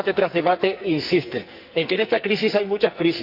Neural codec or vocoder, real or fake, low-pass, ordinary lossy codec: codec, 44.1 kHz, 2.6 kbps, SNAC; fake; 5.4 kHz; Opus, 64 kbps